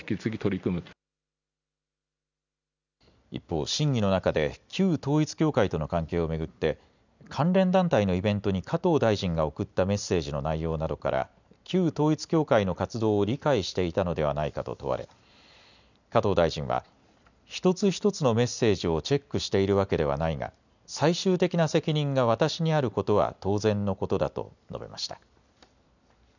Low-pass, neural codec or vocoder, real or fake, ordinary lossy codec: 7.2 kHz; none; real; none